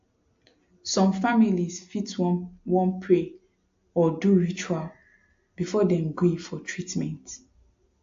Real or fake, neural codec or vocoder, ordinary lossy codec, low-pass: real; none; AAC, 48 kbps; 7.2 kHz